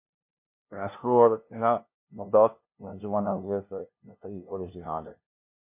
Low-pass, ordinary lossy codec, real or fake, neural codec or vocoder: 3.6 kHz; MP3, 24 kbps; fake; codec, 16 kHz, 0.5 kbps, FunCodec, trained on LibriTTS, 25 frames a second